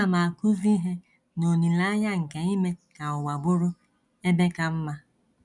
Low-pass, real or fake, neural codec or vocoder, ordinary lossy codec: 10.8 kHz; real; none; none